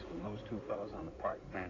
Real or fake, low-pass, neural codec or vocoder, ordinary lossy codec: fake; 7.2 kHz; codec, 16 kHz in and 24 kHz out, 2.2 kbps, FireRedTTS-2 codec; AAC, 32 kbps